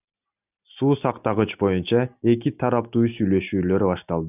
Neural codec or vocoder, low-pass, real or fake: none; 3.6 kHz; real